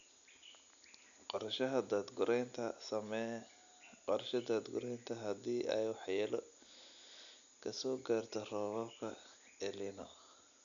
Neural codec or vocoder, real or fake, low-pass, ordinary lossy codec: none; real; 7.2 kHz; none